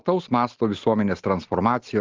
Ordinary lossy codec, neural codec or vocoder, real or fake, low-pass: Opus, 16 kbps; none; real; 7.2 kHz